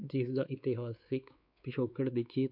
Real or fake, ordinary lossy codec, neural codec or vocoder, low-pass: fake; none; codec, 16 kHz, 4 kbps, X-Codec, WavLM features, trained on Multilingual LibriSpeech; 5.4 kHz